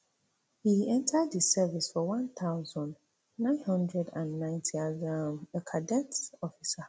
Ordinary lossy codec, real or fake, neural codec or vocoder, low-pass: none; real; none; none